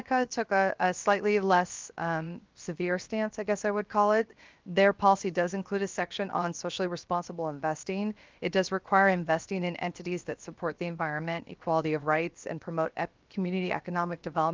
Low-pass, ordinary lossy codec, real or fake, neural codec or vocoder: 7.2 kHz; Opus, 32 kbps; fake; codec, 16 kHz, 0.7 kbps, FocalCodec